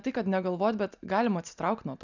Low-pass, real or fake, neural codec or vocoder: 7.2 kHz; real; none